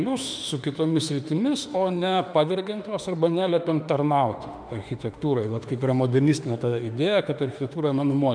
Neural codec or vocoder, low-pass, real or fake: autoencoder, 48 kHz, 32 numbers a frame, DAC-VAE, trained on Japanese speech; 9.9 kHz; fake